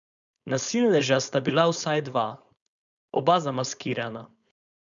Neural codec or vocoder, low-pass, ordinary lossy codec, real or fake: codec, 16 kHz, 4.8 kbps, FACodec; 7.2 kHz; none; fake